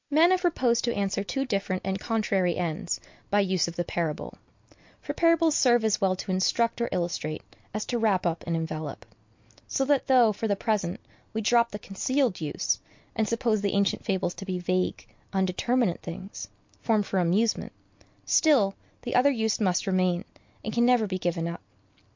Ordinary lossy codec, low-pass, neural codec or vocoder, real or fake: MP3, 48 kbps; 7.2 kHz; none; real